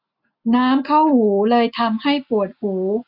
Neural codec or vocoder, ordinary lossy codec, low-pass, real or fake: none; none; 5.4 kHz; real